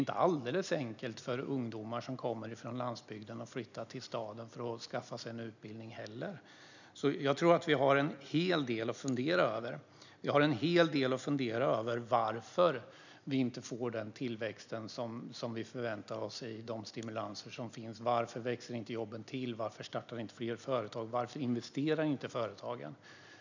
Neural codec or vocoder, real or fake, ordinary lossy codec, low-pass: none; real; none; 7.2 kHz